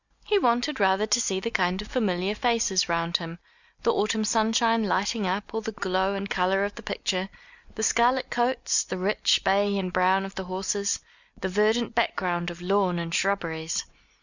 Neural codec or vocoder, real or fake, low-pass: none; real; 7.2 kHz